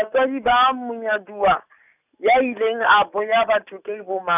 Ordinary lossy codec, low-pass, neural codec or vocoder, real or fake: none; 3.6 kHz; none; real